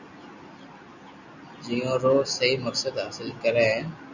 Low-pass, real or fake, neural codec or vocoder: 7.2 kHz; real; none